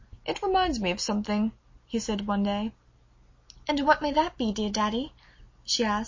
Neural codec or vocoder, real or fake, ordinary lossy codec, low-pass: none; real; MP3, 32 kbps; 7.2 kHz